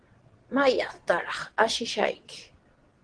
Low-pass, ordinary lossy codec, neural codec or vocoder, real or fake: 9.9 kHz; Opus, 16 kbps; vocoder, 22.05 kHz, 80 mel bands, WaveNeXt; fake